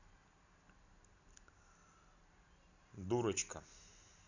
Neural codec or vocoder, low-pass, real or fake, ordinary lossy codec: none; 7.2 kHz; real; none